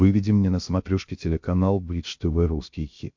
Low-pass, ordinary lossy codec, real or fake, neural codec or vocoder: 7.2 kHz; MP3, 48 kbps; fake; codec, 16 kHz, 0.7 kbps, FocalCodec